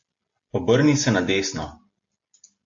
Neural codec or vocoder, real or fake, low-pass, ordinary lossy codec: none; real; 7.2 kHz; AAC, 64 kbps